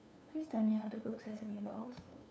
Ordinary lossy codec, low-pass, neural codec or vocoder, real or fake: none; none; codec, 16 kHz, 2 kbps, FunCodec, trained on LibriTTS, 25 frames a second; fake